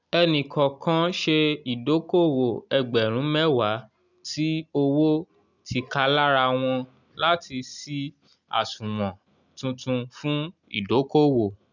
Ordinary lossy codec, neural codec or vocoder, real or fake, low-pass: none; none; real; 7.2 kHz